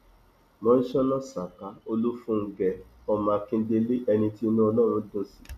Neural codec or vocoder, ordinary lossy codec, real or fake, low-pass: none; MP3, 96 kbps; real; 14.4 kHz